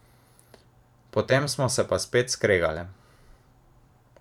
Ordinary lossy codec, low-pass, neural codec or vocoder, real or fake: none; 19.8 kHz; vocoder, 44.1 kHz, 128 mel bands every 256 samples, BigVGAN v2; fake